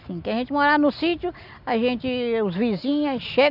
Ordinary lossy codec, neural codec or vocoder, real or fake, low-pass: none; none; real; 5.4 kHz